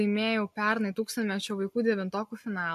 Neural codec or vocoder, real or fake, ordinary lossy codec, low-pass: none; real; MP3, 64 kbps; 14.4 kHz